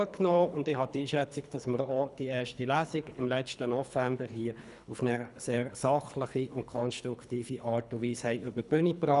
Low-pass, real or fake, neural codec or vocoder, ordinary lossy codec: 10.8 kHz; fake; codec, 24 kHz, 3 kbps, HILCodec; none